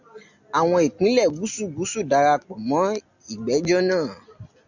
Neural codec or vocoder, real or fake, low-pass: none; real; 7.2 kHz